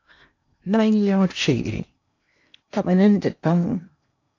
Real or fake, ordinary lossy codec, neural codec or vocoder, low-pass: fake; AAC, 48 kbps; codec, 16 kHz in and 24 kHz out, 0.8 kbps, FocalCodec, streaming, 65536 codes; 7.2 kHz